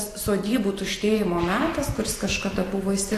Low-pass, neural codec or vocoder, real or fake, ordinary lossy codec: 14.4 kHz; vocoder, 44.1 kHz, 128 mel bands every 512 samples, BigVGAN v2; fake; AAC, 48 kbps